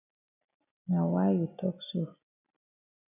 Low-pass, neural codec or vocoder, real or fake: 3.6 kHz; none; real